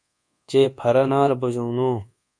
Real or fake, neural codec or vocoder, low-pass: fake; codec, 24 kHz, 1.2 kbps, DualCodec; 9.9 kHz